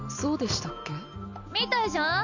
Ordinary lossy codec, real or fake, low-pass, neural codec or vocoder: none; real; 7.2 kHz; none